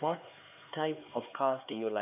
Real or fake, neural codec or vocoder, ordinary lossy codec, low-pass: fake; codec, 16 kHz, 4 kbps, X-Codec, HuBERT features, trained on LibriSpeech; none; 3.6 kHz